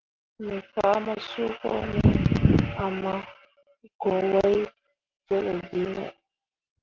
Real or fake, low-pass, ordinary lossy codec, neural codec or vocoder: real; 7.2 kHz; Opus, 16 kbps; none